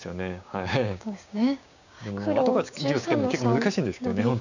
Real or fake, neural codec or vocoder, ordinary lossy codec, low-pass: fake; codec, 16 kHz, 6 kbps, DAC; none; 7.2 kHz